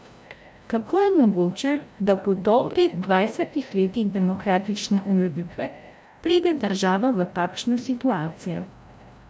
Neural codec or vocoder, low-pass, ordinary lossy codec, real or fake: codec, 16 kHz, 0.5 kbps, FreqCodec, larger model; none; none; fake